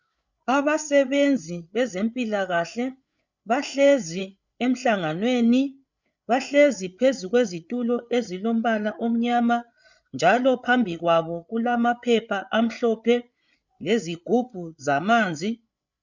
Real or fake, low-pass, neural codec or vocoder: fake; 7.2 kHz; codec, 16 kHz, 8 kbps, FreqCodec, larger model